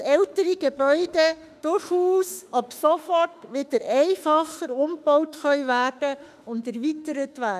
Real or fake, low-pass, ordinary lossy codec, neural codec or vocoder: fake; 14.4 kHz; none; autoencoder, 48 kHz, 32 numbers a frame, DAC-VAE, trained on Japanese speech